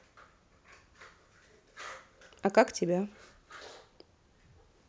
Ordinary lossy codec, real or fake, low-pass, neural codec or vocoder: none; real; none; none